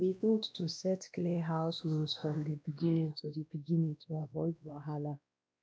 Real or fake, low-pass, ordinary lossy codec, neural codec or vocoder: fake; none; none; codec, 16 kHz, 1 kbps, X-Codec, WavLM features, trained on Multilingual LibriSpeech